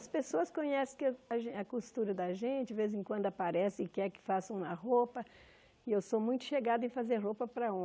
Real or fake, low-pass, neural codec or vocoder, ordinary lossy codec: real; none; none; none